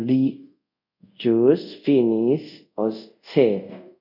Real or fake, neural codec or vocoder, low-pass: fake; codec, 24 kHz, 0.5 kbps, DualCodec; 5.4 kHz